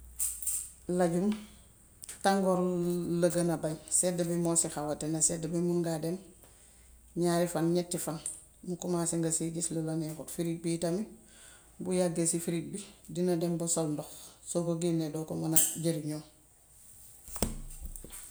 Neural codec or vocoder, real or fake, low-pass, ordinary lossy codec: none; real; none; none